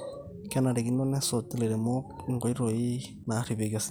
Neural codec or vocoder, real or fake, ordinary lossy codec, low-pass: none; real; none; none